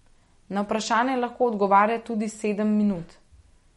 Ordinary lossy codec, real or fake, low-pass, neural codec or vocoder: MP3, 48 kbps; real; 19.8 kHz; none